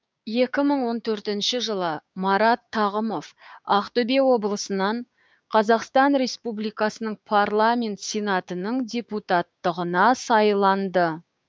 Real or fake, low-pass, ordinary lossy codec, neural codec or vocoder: fake; none; none; codec, 16 kHz, 6 kbps, DAC